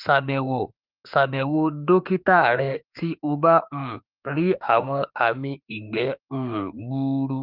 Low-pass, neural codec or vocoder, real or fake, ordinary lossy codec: 5.4 kHz; autoencoder, 48 kHz, 32 numbers a frame, DAC-VAE, trained on Japanese speech; fake; Opus, 32 kbps